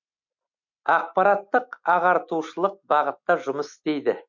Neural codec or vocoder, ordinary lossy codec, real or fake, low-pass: none; MP3, 48 kbps; real; 7.2 kHz